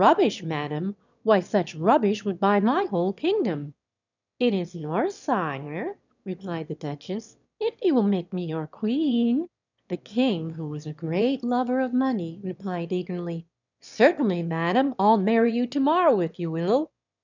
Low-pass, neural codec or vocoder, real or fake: 7.2 kHz; autoencoder, 22.05 kHz, a latent of 192 numbers a frame, VITS, trained on one speaker; fake